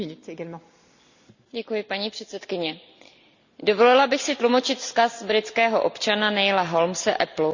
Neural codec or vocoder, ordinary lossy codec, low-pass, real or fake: none; Opus, 64 kbps; 7.2 kHz; real